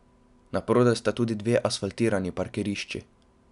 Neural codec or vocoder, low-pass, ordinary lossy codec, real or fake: none; 10.8 kHz; none; real